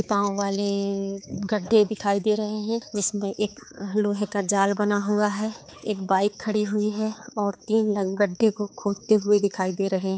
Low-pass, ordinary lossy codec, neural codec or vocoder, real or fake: none; none; codec, 16 kHz, 4 kbps, X-Codec, HuBERT features, trained on balanced general audio; fake